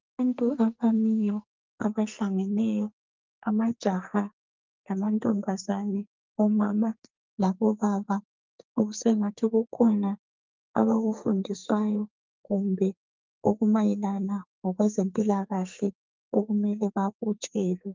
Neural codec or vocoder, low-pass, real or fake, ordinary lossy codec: codec, 44.1 kHz, 2.6 kbps, SNAC; 7.2 kHz; fake; Opus, 24 kbps